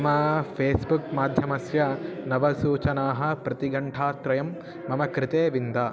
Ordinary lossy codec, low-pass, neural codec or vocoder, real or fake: none; none; none; real